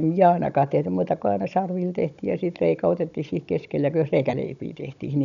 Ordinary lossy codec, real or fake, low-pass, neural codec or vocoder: none; fake; 7.2 kHz; codec, 16 kHz, 16 kbps, FunCodec, trained on Chinese and English, 50 frames a second